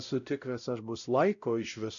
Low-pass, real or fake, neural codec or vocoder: 7.2 kHz; fake; codec, 16 kHz, 0.5 kbps, X-Codec, WavLM features, trained on Multilingual LibriSpeech